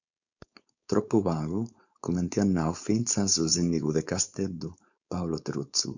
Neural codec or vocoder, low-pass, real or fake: codec, 16 kHz, 4.8 kbps, FACodec; 7.2 kHz; fake